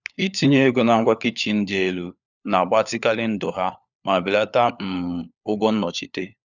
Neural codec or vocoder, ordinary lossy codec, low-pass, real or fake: codec, 16 kHz, 4 kbps, FunCodec, trained on LibriTTS, 50 frames a second; none; 7.2 kHz; fake